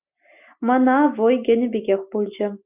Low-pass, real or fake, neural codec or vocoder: 3.6 kHz; real; none